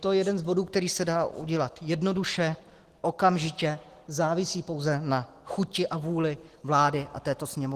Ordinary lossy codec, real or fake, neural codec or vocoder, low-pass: Opus, 16 kbps; real; none; 14.4 kHz